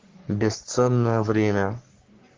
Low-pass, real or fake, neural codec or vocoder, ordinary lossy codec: 7.2 kHz; fake; codec, 44.1 kHz, 3.4 kbps, Pupu-Codec; Opus, 16 kbps